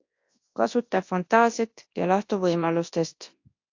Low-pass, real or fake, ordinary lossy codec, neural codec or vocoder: 7.2 kHz; fake; AAC, 48 kbps; codec, 24 kHz, 0.9 kbps, WavTokenizer, large speech release